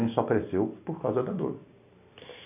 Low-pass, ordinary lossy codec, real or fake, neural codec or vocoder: 3.6 kHz; none; real; none